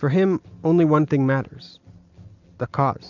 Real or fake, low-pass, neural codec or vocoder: real; 7.2 kHz; none